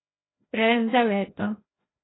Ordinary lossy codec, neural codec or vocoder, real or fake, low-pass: AAC, 16 kbps; codec, 16 kHz, 0.5 kbps, FreqCodec, larger model; fake; 7.2 kHz